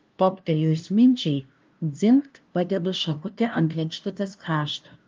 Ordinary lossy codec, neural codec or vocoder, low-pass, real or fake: Opus, 32 kbps; codec, 16 kHz, 0.5 kbps, FunCodec, trained on LibriTTS, 25 frames a second; 7.2 kHz; fake